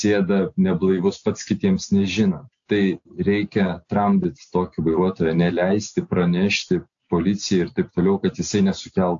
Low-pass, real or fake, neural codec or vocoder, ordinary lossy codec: 7.2 kHz; real; none; AAC, 64 kbps